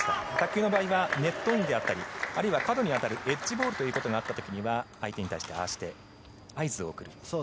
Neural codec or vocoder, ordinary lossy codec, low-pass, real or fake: none; none; none; real